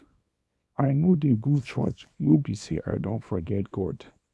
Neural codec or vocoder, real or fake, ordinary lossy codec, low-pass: codec, 24 kHz, 0.9 kbps, WavTokenizer, small release; fake; none; none